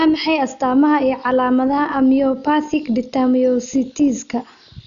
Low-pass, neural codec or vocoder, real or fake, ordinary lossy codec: 7.2 kHz; none; real; none